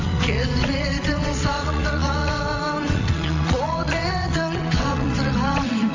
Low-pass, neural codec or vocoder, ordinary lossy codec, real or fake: 7.2 kHz; vocoder, 22.05 kHz, 80 mel bands, WaveNeXt; AAC, 48 kbps; fake